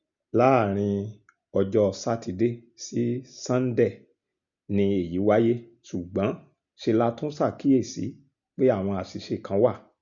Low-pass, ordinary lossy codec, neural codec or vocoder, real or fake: 7.2 kHz; none; none; real